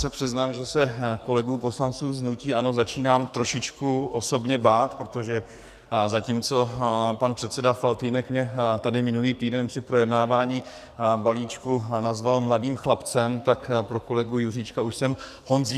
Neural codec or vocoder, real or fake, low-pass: codec, 44.1 kHz, 2.6 kbps, SNAC; fake; 14.4 kHz